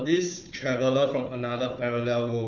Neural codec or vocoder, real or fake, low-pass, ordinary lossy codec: codec, 16 kHz, 4 kbps, FunCodec, trained on Chinese and English, 50 frames a second; fake; 7.2 kHz; none